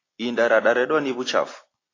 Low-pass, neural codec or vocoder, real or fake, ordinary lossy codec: 7.2 kHz; none; real; AAC, 32 kbps